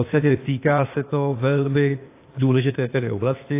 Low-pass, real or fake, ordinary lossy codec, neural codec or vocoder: 3.6 kHz; fake; AAC, 24 kbps; codec, 16 kHz, 0.8 kbps, ZipCodec